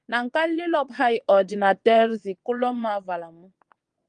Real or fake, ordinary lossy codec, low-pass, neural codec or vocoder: fake; Opus, 32 kbps; 9.9 kHz; vocoder, 22.05 kHz, 80 mel bands, WaveNeXt